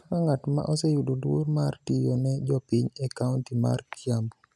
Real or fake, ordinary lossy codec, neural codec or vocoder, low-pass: real; none; none; none